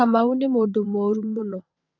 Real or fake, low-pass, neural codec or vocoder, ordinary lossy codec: fake; 7.2 kHz; vocoder, 22.05 kHz, 80 mel bands, WaveNeXt; MP3, 48 kbps